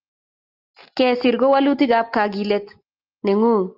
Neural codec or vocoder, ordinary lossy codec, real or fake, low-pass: none; Opus, 32 kbps; real; 5.4 kHz